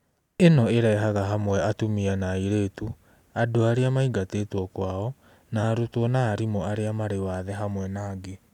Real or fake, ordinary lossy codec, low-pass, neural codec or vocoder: real; none; 19.8 kHz; none